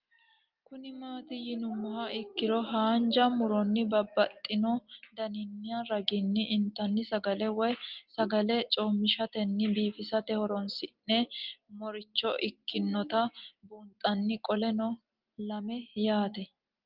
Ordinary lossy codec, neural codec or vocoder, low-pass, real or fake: Opus, 32 kbps; none; 5.4 kHz; real